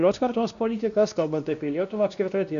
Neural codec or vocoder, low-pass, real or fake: codec, 16 kHz, 1 kbps, X-Codec, WavLM features, trained on Multilingual LibriSpeech; 7.2 kHz; fake